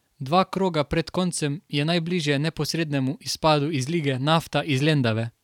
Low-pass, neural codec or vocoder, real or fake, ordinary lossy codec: 19.8 kHz; vocoder, 44.1 kHz, 128 mel bands every 512 samples, BigVGAN v2; fake; none